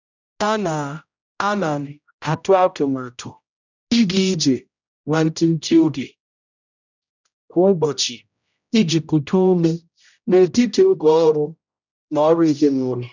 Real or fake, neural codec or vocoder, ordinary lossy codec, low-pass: fake; codec, 16 kHz, 0.5 kbps, X-Codec, HuBERT features, trained on general audio; none; 7.2 kHz